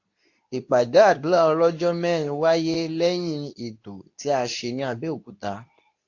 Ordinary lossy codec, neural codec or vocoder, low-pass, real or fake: AAC, 48 kbps; codec, 24 kHz, 0.9 kbps, WavTokenizer, medium speech release version 2; 7.2 kHz; fake